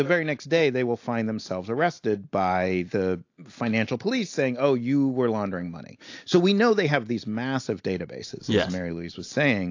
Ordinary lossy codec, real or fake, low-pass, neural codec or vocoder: AAC, 48 kbps; real; 7.2 kHz; none